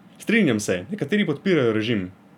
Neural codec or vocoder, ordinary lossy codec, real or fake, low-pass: none; none; real; 19.8 kHz